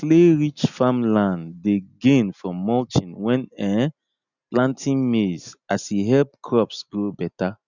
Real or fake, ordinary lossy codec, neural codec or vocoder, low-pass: real; none; none; 7.2 kHz